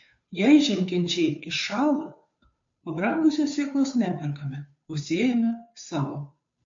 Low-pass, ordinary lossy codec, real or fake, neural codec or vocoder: 7.2 kHz; MP3, 48 kbps; fake; codec, 16 kHz, 2 kbps, FunCodec, trained on Chinese and English, 25 frames a second